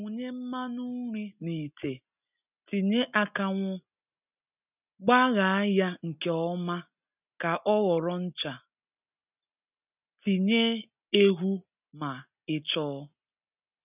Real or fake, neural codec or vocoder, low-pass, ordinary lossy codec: real; none; 3.6 kHz; none